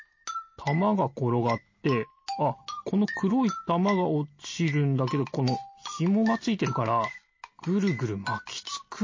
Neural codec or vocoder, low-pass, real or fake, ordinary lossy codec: none; 7.2 kHz; real; MP3, 32 kbps